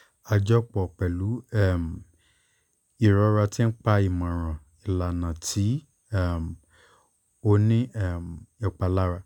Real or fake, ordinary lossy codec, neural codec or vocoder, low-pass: real; none; none; 19.8 kHz